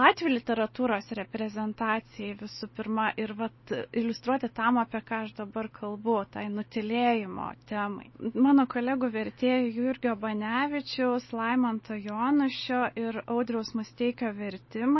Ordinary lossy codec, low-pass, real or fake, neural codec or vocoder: MP3, 24 kbps; 7.2 kHz; real; none